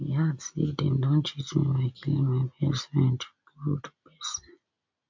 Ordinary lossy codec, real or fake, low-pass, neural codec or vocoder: MP3, 48 kbps; real; 7.2 kHz; none